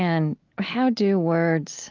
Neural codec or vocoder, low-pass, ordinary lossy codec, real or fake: none; 7.2 kHz; Opus, 32 kbps; real